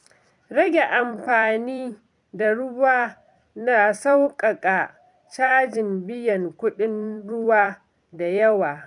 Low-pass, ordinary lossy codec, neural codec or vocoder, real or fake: 10.8 kHz; MP3, 96 kbps; vocoder, 44.1 kHz, 128 mel bands every 256 samples, BigVGAN v2; fake